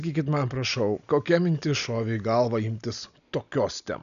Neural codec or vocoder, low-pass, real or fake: none; 7.2 kHz; real